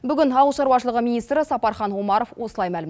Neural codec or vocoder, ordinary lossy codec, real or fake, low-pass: none; none; real; none